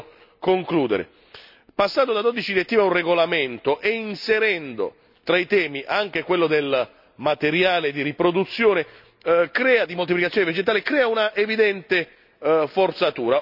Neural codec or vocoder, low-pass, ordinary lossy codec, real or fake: none; 5.4 kHz; none; real